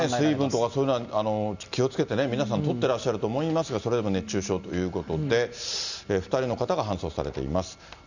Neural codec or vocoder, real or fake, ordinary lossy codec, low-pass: none; real; none; 7.2 kHz